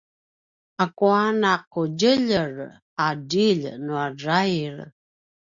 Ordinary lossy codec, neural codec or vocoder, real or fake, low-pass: Opus, 64 kbps; none; real; 7.2 kHz